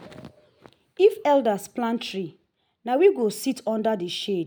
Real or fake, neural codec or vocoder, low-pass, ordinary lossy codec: real; none; none; none